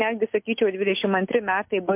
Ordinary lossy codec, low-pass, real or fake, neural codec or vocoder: MP3, 32 kbps; 3.6 kHz; real; none